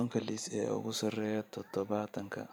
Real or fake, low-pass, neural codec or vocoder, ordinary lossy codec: fake; none; vocoder, 44.1 kHz, 128 mel bands every 512 samples, BigVGAN v2; none